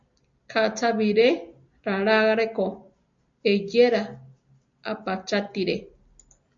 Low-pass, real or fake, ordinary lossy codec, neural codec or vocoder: 7.2 kHz; real; MP3, 48 kbps; none